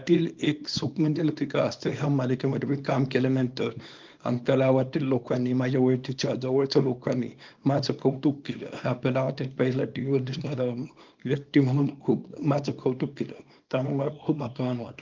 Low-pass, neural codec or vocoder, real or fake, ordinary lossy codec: 7.2 kHz; codec, 24 kHz, 0.9 kbps, WavTokenizer, small release; fake; Opus, 24 kbps